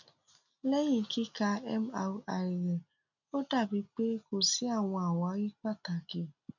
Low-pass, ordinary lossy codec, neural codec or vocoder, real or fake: 7.2 kHz; none; none; real